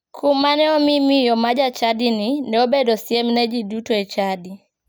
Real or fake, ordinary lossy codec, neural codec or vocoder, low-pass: real; none; none; none